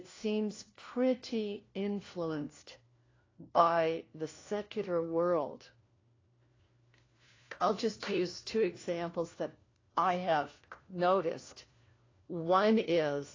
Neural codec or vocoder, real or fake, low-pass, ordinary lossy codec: codec, 16 kHz, 1 kbps, FunCodec, trained on LibriTTS, 50 frames a second; fake; 7.2 kHz; AAC, 32 kbps